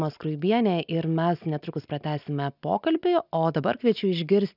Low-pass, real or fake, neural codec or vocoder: 5.4 kHz; real; none